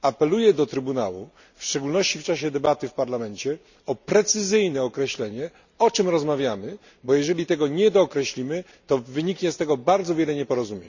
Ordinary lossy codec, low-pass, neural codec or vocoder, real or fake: none; 7.2 kHz; none; real